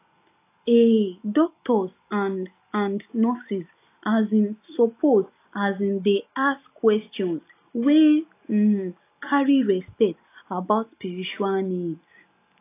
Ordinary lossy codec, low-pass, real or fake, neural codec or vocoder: AAC, 24 kbps; 3.6 kHz; real; none